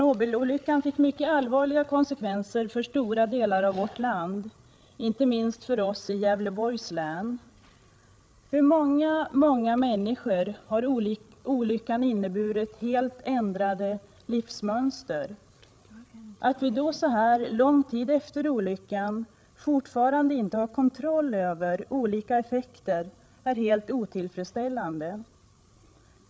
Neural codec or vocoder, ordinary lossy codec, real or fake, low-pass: codec, 16 kHz, 8 kbps, FreqCodec, larger model; none; fake; none